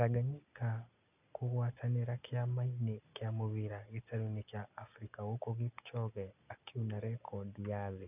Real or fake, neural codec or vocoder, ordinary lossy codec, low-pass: real; none; none; 3.6 kHz